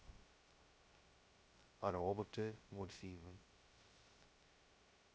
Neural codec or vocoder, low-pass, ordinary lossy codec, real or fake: codec, 16 kHz, 0.2 kbps, FocalCodec; none; none; fake